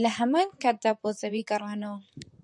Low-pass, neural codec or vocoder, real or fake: 10.8 kHz; vocoder, 44.1 kHz, 128 mel bands, Pupu-Vocoder; fake